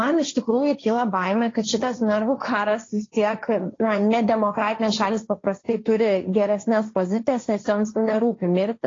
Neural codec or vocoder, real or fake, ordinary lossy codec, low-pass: codec, 16 kHz, 1.1 kbps, Voila-Tokenizer; fake; AAC, 32 kbps; 7.2 kHz